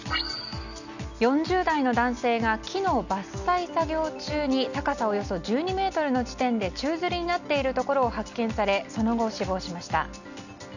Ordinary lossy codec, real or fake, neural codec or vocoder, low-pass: none; real; none; 7.2 kHz